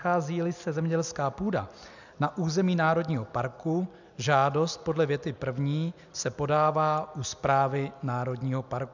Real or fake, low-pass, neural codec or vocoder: real; 7.2 kHz; none